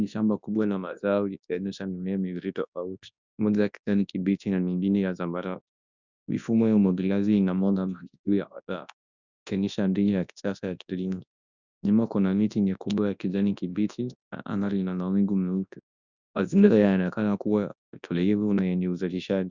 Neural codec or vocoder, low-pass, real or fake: codec, 24 kHz, 0.9 kbps, WavTokenizer, large speech release; 7.2 kHz; fake